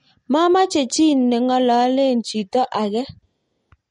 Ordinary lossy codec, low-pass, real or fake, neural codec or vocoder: MP3, 48 kbps; 19.8 kHz; real; none